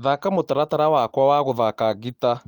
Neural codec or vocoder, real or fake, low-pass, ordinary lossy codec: none; real; 19.8 kHz; Opus, 24 kbps